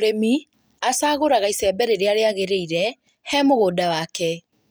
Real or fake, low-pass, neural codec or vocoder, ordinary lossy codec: real; none; none; none